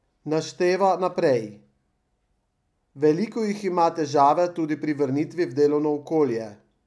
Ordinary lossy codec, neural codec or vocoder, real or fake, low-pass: none; none; real; none